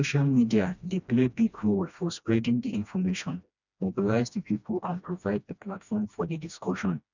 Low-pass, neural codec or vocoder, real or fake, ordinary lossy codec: 7.2 kHz; codec, 16 kHz, 1 kbps, FreqCodec, smaller model; fake; none